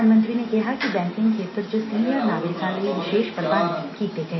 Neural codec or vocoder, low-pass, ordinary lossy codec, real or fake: none; 7.2 kHz; MP3, 24 kbps; real